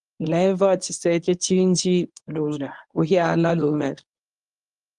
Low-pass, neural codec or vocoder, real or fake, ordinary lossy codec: 10.8 kHz; codec, 24 kHz, 0.9 kbps, WavTokenizer, medium speech release version 1; fake; Opus, 32 kbps